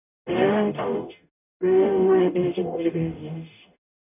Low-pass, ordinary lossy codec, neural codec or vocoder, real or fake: 3.6 kHz; none; codec, 44.1 kHz, 0.9 kbps, DAC; fake